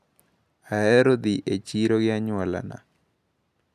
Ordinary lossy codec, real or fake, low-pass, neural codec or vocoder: none; real; 14.4 kHz; none